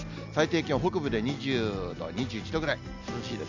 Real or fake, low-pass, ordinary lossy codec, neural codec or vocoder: real; 7.2 kHz; none; none